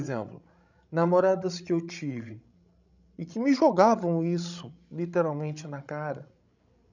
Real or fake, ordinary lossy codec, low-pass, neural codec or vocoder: fake; none; 7.2 kHz; codec, 16 kHz, 16 kbps, FreqCodec, larger model